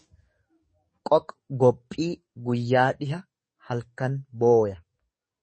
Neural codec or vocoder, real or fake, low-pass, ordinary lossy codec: codec, 44.1 kHz, 7.8 kbps, DAC; fake; 10.8 kHz; MP3, 32 kbps